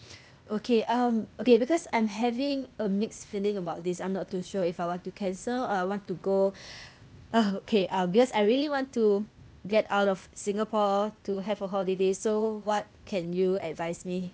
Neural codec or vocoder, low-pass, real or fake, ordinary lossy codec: codec, 16 kHz, 0.8 kbps, ZipCodec; none; fake; none